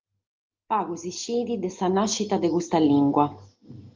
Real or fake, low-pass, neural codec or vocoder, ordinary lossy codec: real; 7.2 kHz; none; Opus, 32 kbps